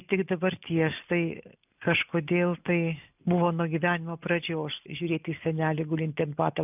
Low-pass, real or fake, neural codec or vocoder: 3.6 kHz; real; none